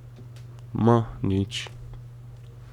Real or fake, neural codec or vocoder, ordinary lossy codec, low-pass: fake; codec, 44.1 kHz, 7.8 kbps, Pupu-Codec; none; 19.8 kHz